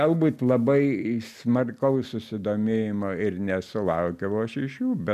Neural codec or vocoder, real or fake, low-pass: none; real; 14.4 kHz